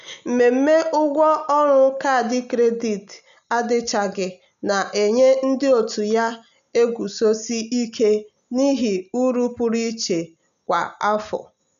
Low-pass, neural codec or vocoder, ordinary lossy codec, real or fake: 7.2 kHz; none; none; real